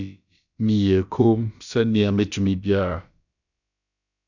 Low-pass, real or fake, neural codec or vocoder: 7.2 kHz; fake; codec, 16 kHz, about 1 kbps, DyCAST, with the encoder's durations